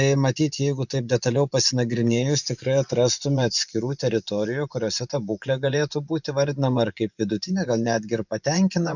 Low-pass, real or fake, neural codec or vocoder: 7.2 kHz; fake; vocoder, 24 kHz, 100 mel bands, Vocos